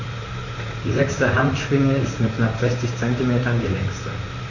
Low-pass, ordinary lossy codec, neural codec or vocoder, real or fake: 7.2 kHz; none; vocoder, 44.1 kHz, 128 mel bands, Pupu-Vocoder; fake